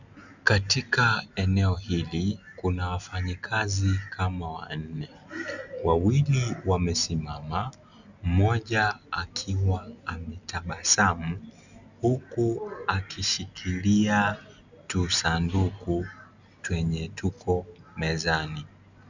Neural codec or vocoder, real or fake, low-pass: none; real; 7.2 kHz